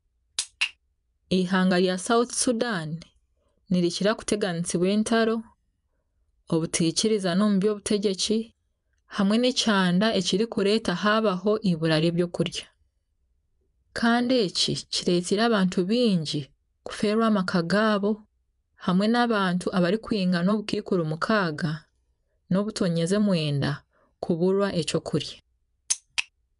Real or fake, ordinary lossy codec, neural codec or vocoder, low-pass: fake; none; vocoder, 24 kHz, 100 mel bands, Vocos; 10.8 kHz